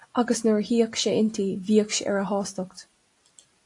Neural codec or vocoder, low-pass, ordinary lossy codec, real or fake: none; 10.8 kHz; AAC, 48 kbps; real